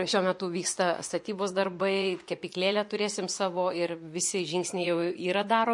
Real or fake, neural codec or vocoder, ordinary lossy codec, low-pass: fake; vocoder, 24 kHz, 100 mel bands, Vocos; MP3, 48 kbps; 10.8 kHz